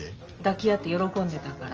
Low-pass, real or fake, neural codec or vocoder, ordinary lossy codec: 7.2 kHz; real; none; Opus, 24 kbps